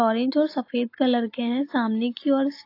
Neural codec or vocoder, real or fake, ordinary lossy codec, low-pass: none; real; AAC, 32 kbps; 5.4 kHz